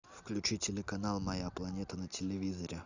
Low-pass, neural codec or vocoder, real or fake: 7.2 kHz; none; real